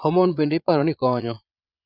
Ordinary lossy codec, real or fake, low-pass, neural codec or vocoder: none; fake; 5.4 kHz; vocoder, 22.05 kHz, 80 mel bands, Vocos